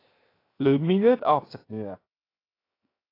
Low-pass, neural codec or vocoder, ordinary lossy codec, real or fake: 5.4 kHz; codec, 16 kHz, 0.7 kbps, FocalCodec; AAC, 24 kbps; fake